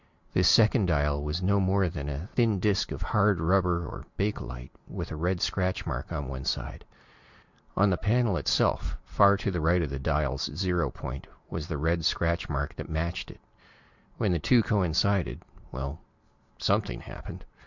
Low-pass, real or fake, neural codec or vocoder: 7.2 kHz; real; none